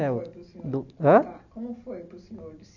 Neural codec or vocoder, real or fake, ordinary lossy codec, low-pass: none; real; none; 7.2 kHz